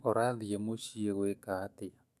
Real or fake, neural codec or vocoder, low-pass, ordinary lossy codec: fake; autoencoder, 48 kHz, 128 numbers a frame, DAC-VAE, trained on Japanese speech; 14.4 kHz; none